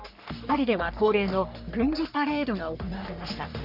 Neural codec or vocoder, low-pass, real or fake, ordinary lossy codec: codec, 44.1 kHz, 3.4 kbps, Pupu-Codec; 5.4 kHz; fake; none